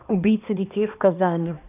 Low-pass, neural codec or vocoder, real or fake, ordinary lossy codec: 3.6 kHz; codec, 16 kHz, 2 kbps, X-Codec, HuBERT features, trained on balanced general audio; fake; none